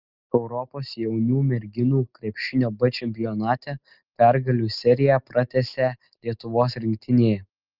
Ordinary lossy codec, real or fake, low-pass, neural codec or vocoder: Opus, 32 kbps; real; 5.4 kHz; none